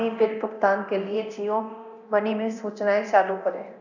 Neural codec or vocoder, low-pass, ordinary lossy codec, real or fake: codec, 24 kHz, 0.9 kbps, DualCodec; 7.2 kHz; none; fake